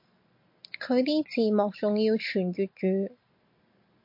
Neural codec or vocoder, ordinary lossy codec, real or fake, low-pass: none; MP3, 32 kbps; real; 5.4 kHz